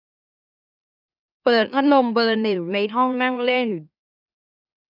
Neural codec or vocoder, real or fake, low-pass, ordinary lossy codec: autoencoder, 44.1 kHz, a latent of 192 numbers a frame, MeloTTS; fake; 5.4 kHz; none